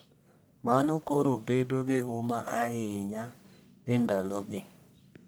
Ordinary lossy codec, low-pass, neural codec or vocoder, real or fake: none; none; codec, 44.1 kHz, 1.7 kbps, Pupu-Codec; fake